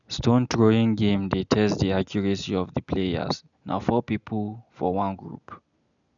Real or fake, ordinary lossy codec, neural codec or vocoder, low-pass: real; none; none; 7.2 kHz